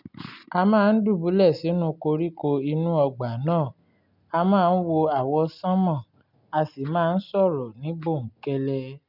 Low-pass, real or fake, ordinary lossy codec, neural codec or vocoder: 5.4 kHz; real; none; none